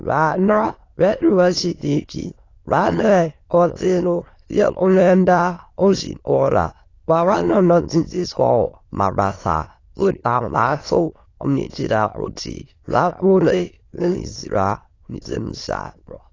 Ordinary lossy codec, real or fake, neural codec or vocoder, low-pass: AAC, 32 kbps; fake; autoencoder, 22.05 kHz, a latent of 192 numbers a frame, VITS, trained on many speakers; 7.2 kHz